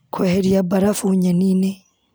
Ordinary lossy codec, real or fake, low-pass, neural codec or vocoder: none; real; none; none